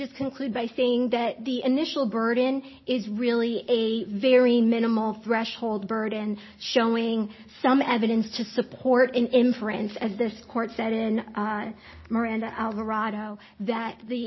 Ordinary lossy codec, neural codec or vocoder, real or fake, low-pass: MP3, 24 kbps; none; real; 7.2 kHz